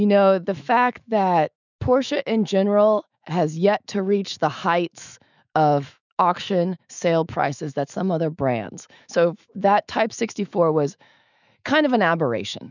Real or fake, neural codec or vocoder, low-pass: fake; autoencoder, 48 kHz, 128 numbers a frame, DAC-VAE, trained on Japanese speech; 7.2 kHz